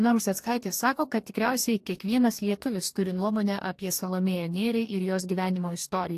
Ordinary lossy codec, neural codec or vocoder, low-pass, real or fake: AAC, 64 kbps; codec, 44.1 kHz, 2.6 kbps, DAC; 14.4 kHz; fake